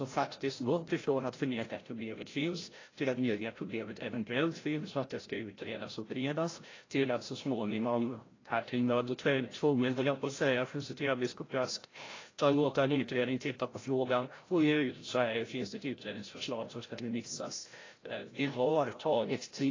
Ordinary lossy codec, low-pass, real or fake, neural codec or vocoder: AAC, 32 kbps; 7.2 kHz; fake; codec, 16 kHz, 0.5 kbps, FreqCodec, larger model